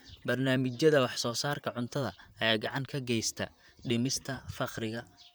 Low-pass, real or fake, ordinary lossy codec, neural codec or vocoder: none; fake; none; vocoder, 44.1 kHz, 128 mel bands, Pupu-Vocoder